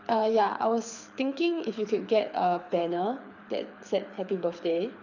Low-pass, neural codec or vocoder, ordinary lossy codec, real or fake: 7.2 kHz; codec, 24 kHz, 6 kbps, HILCodec; none; fake